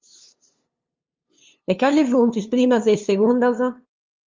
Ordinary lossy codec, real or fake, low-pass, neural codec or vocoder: Opus, 32 kbps; fake; 7.2 kHz; codec, 16 kHz, 2 kbps, FunCodec, trained on LibriTTS, 25 frames a second